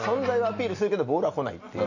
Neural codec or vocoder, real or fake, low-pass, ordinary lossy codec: none; real; 7.2 kHz; none